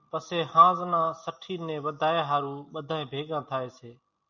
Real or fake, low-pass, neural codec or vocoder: real; 7.2 kHz; none